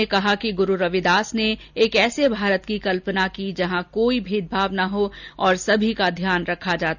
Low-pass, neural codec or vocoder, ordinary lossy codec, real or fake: 7.2 kHz; none; none; real